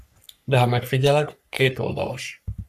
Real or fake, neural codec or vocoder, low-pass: fake; codec, 44.1 kHz, 3.4 kbps, Pupu-Codec; 14.4 kHz